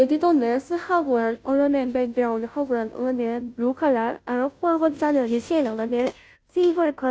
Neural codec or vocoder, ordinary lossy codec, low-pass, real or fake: codec, 16 kHz, 0.5 kbps, FunCodec, trained on Chinese and English, 25 frames a second; none; none; fake